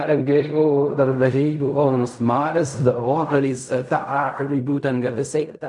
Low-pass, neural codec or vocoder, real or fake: 10.8 kHz; codec, 16 kHz in and 24 kHz out, 0.4 kbps, LongCat-Audio-Codec, fine tuned four codebook decoder; fake